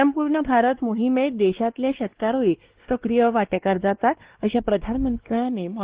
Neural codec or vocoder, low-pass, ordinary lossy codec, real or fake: codec, 16 kHz, 2 kbps, X-Codec, WavLM features, trained on Multilingual LibriSpeech; 3.6 kHz; Opus, 16 kbps; fake